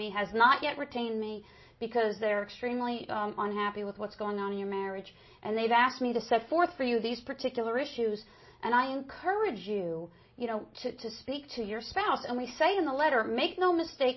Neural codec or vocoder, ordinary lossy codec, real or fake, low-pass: none; MP3, 24 kbps; real; 7.2 kHz